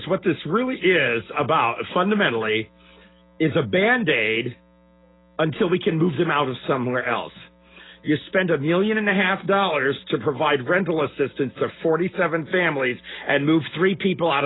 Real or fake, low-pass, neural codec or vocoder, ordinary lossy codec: real; 7.2 kHz; none; AAC, 16 kbps